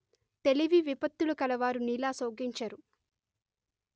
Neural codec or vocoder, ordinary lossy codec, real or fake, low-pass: none; none; real; none